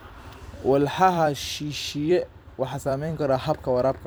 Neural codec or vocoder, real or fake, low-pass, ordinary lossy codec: vocoder, 44.1 kHz, 128 mel bands every 256 samples, BigVGAN v2; fake; none; none